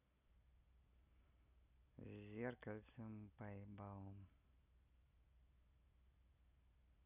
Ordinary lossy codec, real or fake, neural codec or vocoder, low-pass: none; real; none; 3.6 kHz